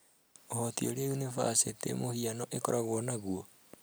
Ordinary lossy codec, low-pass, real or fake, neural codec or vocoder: none; none; real; none